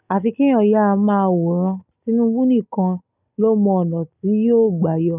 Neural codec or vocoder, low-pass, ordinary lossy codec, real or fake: none; 3.6 kHz; none; real